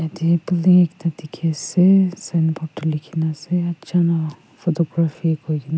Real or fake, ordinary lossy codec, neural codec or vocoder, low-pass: real; none; none; none